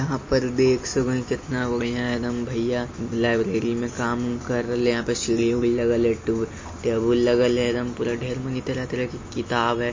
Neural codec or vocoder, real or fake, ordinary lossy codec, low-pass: none; real; MP3, 32 kbps; 7.2 kHz